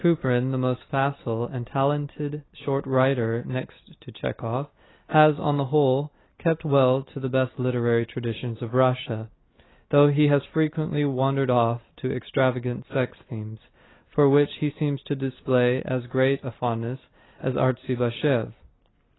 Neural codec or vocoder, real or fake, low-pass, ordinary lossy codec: none; real; 7.2 kHz; AAC, 16 kbps